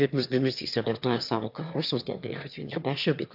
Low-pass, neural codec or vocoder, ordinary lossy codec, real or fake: 5.4 kHz; autoencoder, 22.05 kHz, a latent of 192 numbers a frame, VITS, trained on one speaker; AAC, 48 kbps; fake